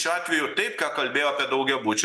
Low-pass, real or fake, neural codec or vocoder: 14.4 kHz; real; none